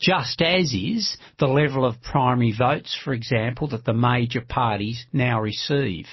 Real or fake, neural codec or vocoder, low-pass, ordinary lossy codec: real; none; 7.2 kHz; MP3, 24 kbps